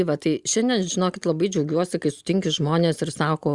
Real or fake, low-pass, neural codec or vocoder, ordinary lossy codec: real; 10.8 kHz; none; Opus, 64 kbps